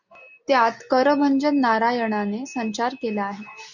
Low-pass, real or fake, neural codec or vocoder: 7.2 kHz; real; none